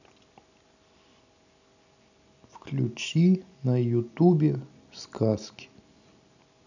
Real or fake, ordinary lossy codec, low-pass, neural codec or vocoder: real; none; 7.2 kHz; none